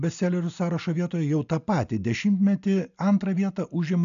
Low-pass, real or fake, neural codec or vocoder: 7.2 kHz; real; none